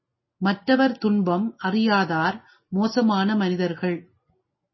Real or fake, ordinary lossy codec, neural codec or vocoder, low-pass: real; MP3, 24 kbps; none; 7.2 kHz